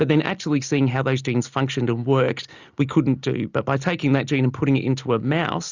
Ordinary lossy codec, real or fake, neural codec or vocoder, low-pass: Opus, 64 kbps; real; none; 7.2 kHz